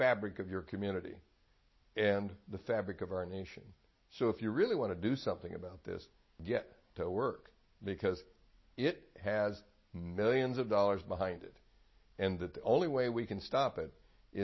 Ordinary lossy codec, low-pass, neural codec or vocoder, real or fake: MP3, 24 kbps; 7.2 kHz; none; real